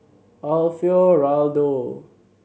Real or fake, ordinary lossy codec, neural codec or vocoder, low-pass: real; none; none; none